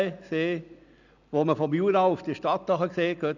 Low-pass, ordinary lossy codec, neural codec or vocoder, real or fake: 7.2 kHz; none; none; real